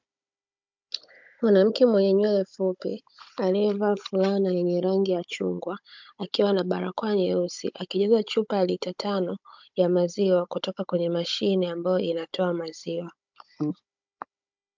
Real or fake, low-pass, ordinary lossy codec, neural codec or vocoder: fake; 7.2 kHz; MP3, 64 kbps; codec, 16 kHz, 16 kbps, FunCodec, trained on Chinese and English, 50 frames a second